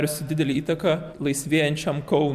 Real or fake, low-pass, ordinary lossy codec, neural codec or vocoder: real; 14.4 kHz; MP3, 96 kbps; none